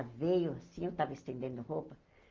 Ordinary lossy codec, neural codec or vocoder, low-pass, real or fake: Opus, 32 kbps; none; 7.2 kHz; real